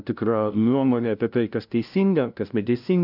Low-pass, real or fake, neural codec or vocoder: 5.4 kHz; fake; codec, 16 kHz, 0.5 kbps, FunCodec, trained on LibriTTS, 25 frames a second